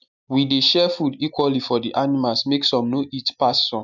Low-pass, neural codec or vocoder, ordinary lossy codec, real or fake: 7.2 kHz; none; none; real